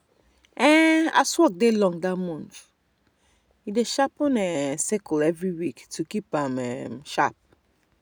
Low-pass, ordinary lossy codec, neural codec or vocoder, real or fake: none; none; none; real